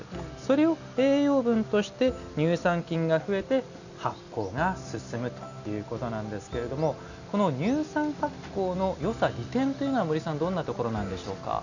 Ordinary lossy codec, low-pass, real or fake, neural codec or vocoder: none; 7.2 kHz; real; none